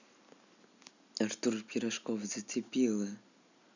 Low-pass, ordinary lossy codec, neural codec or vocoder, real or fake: 7.2 kHz; none; none; real